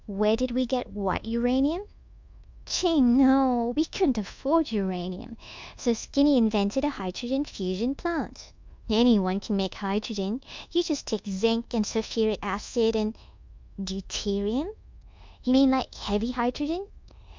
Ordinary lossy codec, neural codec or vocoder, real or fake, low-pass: MP3, 64 kbps; codec, 24 kHz, 1.2 kbps, DualCodec; fake; 7.2 kHz